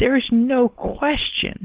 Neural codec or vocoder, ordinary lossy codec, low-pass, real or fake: codec, 16 kHz, 4.8 kbps, FACodec; Opus, 16 kbps; 3.6 kHz; fake